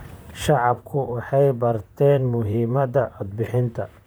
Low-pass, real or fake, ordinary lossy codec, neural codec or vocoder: none; fake; none; vocoder, 44.1 kHz, 128 mel bands, Pupu-Vocoder